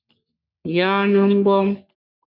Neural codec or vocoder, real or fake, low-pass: codec, 44.1 kHz, 3.4 kbps, Pupu-Codec; fake; 5.4 kHz